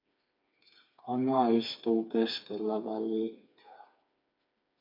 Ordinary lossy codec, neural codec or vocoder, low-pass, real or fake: MP3, 48 kbps; codec, 16 kHz, 4 kbps, FreqCodec, smaller model; 5.4 kHz; fake